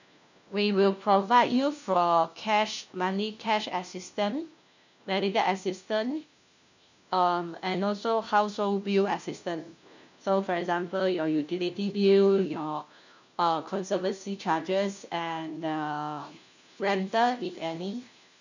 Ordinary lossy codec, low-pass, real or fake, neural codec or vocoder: none; 7.2 kHz; fake; codec, 16 kHz, 1 kbps, FunCodec, trained on LibriTTS, 50 frames a second